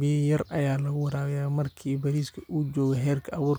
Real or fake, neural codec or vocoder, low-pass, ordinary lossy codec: real; none; none; none